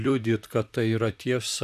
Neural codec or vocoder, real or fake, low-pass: vocoder, 44.1 kHz, 128 mel bands, Pupu-Vocoder; fake; 14.4 kHz